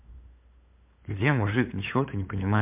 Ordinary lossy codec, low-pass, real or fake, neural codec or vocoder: MP3, 32 kbps; 3.6 kHz; fake; codec, 16 kHz, 8 kbps, FunCodec, trained on LibriTTS, 25 frames a second